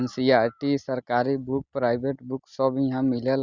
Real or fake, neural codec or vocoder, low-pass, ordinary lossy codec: real; none; 7.2 kHz; Opus, 64 kbps